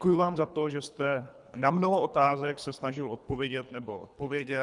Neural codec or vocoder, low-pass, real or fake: codec, 24 kHz, 3 kbps, HILCodec; 10.8 kHz; fake